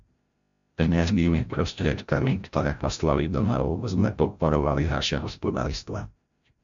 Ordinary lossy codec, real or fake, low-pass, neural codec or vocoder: MP3, 64 kbps; fake; 7.2 kHz; codec, 16 kHz, 0.5 kbps, FreqCodec, larger model